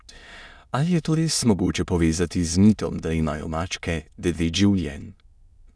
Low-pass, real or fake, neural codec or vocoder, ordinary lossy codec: none; fake; autoencoder, 22.05 kHz, a latent of 192 numbers a frame, VITS, trained on many speakers; none